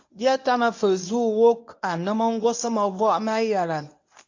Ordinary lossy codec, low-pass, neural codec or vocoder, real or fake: AAC, 48 kbps; 7.2 kHz; codec, 24 kHz, 0.9 kbps, WavTokenizer, medium speech release version 1; fake